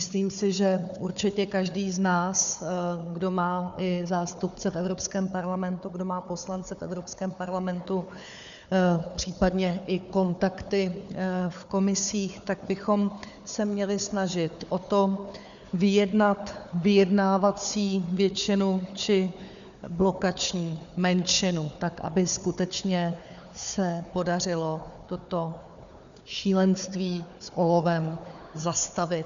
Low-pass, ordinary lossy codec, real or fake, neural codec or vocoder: 7.2 kHz; MP3, 96 kbps; fake; codec, 16 kHz, 4 kbps, FunCodec, trained on Chinese and English, 50 frames a second